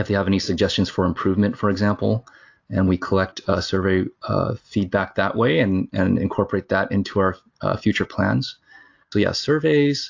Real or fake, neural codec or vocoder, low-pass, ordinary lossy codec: real; none; 7.2 kHz; AAC, 48 kbps